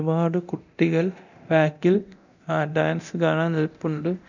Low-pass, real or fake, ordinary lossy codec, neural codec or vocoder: 7.2 kHz; fake; none; codec, 24 kHz, 0.9 kbps, DualCodec